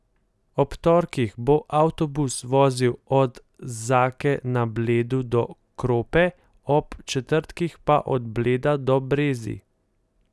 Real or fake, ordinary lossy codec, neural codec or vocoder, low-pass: real; none; none; none